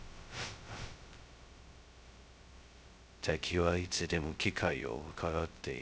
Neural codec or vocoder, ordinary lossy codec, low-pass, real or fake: codec, 16 kHz, 0.2 kbps, FocalCodec; none; none; fake